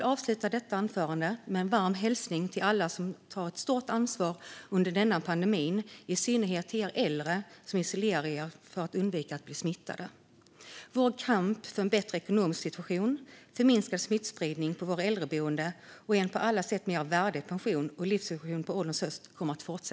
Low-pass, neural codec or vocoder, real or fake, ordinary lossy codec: none; none; real; none